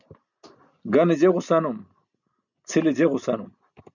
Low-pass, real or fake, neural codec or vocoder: 7.2 kHz; real; none